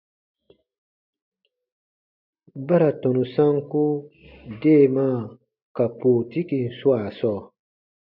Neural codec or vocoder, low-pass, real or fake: none; 5.4 kHz; real